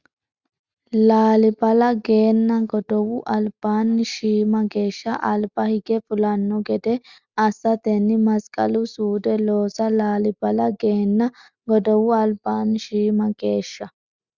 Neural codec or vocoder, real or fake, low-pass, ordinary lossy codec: none; real; 7.2 kHz; Opus, 64 kbps